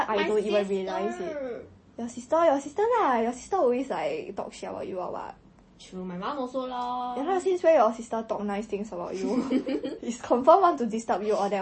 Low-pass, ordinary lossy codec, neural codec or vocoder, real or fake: 10.8 kHz; MP3, 32 kbps; none; real